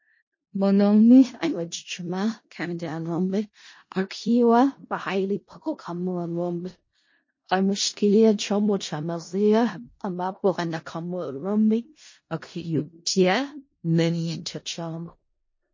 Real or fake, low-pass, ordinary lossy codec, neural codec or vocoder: fake; 7.2 kHz; MP3, 32 kbps; codec, 16 kHz in and 24 kHz out, 0.4 kbps, LongCat-Audio-Codec, four codebook decoder